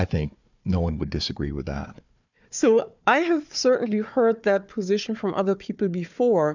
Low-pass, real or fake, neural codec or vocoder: 7.2 kHz; fake; codec, 16 kHz, 4 kbps, FunCodec, trained on Chinese and English, 50 frames a second